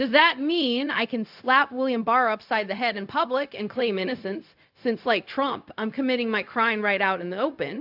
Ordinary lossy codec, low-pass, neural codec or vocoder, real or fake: AAC, 48 kbps; 5.4 kHz; codec, 16 kHz, 0.4 kbps, LongCat-Audio-Codec; fake